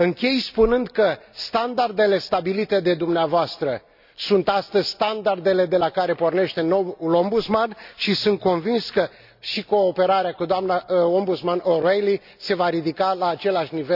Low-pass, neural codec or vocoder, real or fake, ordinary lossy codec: 5.4 kHz; none; real; none